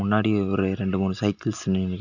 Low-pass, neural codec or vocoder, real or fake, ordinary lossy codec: 7.2 kHz; none; real; none